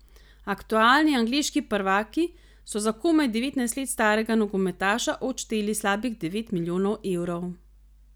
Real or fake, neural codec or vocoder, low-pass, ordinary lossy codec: real; none; none; none